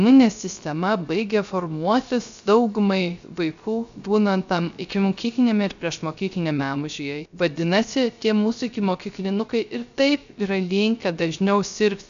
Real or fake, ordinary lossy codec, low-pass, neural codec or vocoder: fake; AAC, 96 kbps; 7.2 kHz; codec, 16 kHz, 0.3 kbps, FocalCodec